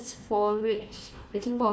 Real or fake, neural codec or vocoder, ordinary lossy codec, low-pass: fake; codec, 16 kHz, 1 kbps, FunCodec, trained on Chinese and English, 50 frames a second; none; none